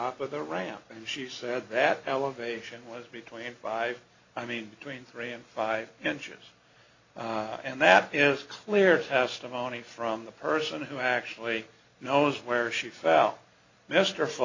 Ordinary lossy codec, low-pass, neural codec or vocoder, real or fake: AAC, 48 kbps; 7.2 kHz; none; real